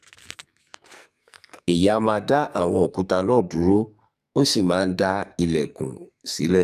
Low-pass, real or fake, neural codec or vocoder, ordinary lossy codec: 14.4 kHz; fake; codec, 44.1 kHz, 2.6 kbps, SNAC; none